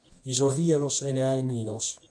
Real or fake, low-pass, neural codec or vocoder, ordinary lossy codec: fake; 9.9 kHz; codec, 24 kHz, 0.9 kbps, WavTokenizer, medium music audio release; AAC, 64 kbps